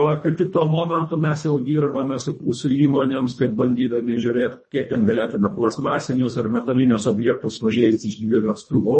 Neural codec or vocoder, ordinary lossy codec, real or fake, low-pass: codec, 24 kHz, 1.5 kbps, HILCodec; MP3, 32 kbps; fake; 10.8 kHz